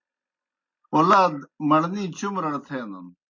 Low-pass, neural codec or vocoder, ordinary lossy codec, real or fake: 7.2 kHz; none; MP3, 32 kbps; real